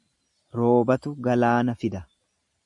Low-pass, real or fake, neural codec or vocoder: 10.8 kHz; real; none